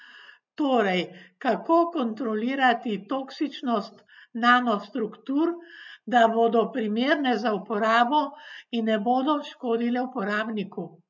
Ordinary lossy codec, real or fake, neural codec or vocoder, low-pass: none; real; none; 7.2 kHz